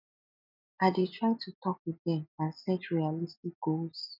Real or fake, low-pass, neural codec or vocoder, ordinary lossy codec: real; 5.4 kHz; none; none